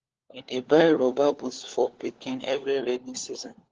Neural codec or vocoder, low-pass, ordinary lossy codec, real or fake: codec, 16 kHz, 4 kbps, FunCodec, trained on LibriTTS, 50 frames a second; 7.2 kHz; Opus, 16 kbps; fake